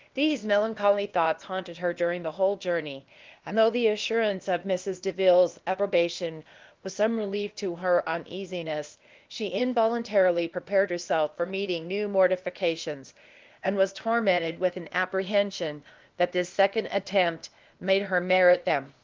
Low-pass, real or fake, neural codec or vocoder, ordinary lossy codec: 7.2 kHz; fake; codec, 16 kHz, 0.8 kbps, ZipCodec; Opus, 32 kbps